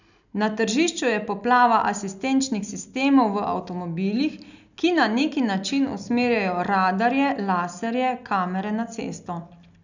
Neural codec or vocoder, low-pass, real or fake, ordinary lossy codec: none; 7.2 kHz; real; none